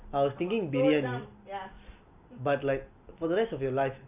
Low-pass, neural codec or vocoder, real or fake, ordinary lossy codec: 3.6 kHz; none; real; none